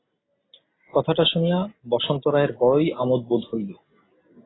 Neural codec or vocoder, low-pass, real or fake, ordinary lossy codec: none; 7.2 kHz; real; AAC, 16 kbps